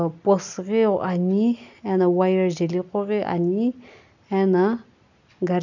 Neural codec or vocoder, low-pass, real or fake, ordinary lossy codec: none; 7.2 kHz; real; none